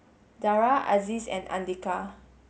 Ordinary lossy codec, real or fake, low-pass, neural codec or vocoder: none; real; none; none